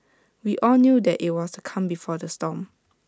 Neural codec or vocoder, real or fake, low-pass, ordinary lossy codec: none; real; none; none